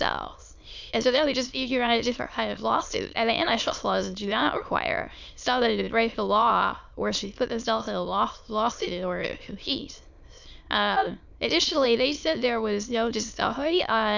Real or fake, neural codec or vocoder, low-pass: fake; autoencoder, 22.05 kHz, a latent of 192 numbers a frame, VITS, trained on many speakers; 7.2 kHz